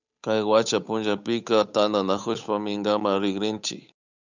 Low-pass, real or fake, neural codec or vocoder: 7.2 kHz; fake; codec, 16 kHz, 8 kbps, FunCodec, trained on Chinese and English, 25 frames a second